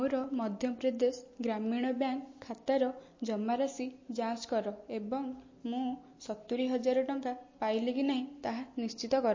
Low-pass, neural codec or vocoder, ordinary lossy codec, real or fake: 7.2 kHz; none; MP3, 32 kbps; real